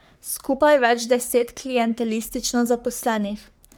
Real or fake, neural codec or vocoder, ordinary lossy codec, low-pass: fake; codec, 44.1 kHz, 3.4 kbps, Pupu-Codec; none; none